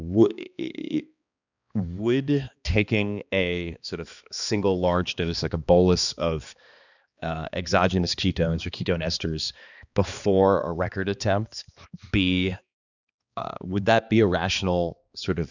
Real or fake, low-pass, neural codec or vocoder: fake; 7.2 kHz; codec, 16 kHz, 2 kbps, X-Codec, HuBERT features, trained on balanced general audio